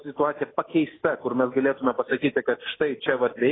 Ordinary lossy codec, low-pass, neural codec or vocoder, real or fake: AAC, 16 kbps; 7.2 kHz; none; real